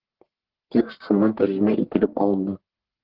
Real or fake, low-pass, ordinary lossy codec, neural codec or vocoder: fake; 5.4 kHz; Opus, 16 kbps; codec, 44.1 kHz, 1.7 kbps, Pupu-Codec